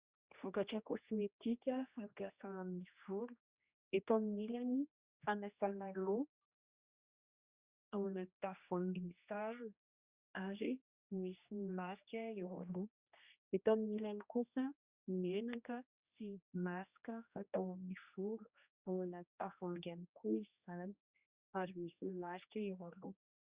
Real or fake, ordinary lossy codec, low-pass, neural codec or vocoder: fake; Opus, 64 kbps; 3.6 kHz; codec, 16 kHz, 1 kbps, X-Codec, HuBERT features, trained on general audio